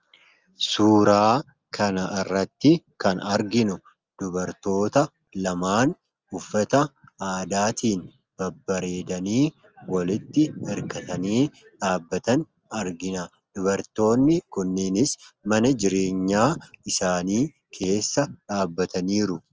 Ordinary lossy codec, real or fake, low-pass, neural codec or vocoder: Opus, 24 kbps; real; 7.2 kHz; none